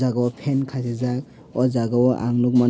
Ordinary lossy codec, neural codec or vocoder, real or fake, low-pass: none; none; real; none